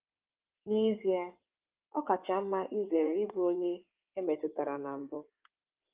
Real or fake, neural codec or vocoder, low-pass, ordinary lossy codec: fake; codec, 16 kHz in and 24 kHz out, 2.2 kbps, FireRedTTS-2 codec; 3.6 kHz; Opus, 24 kbps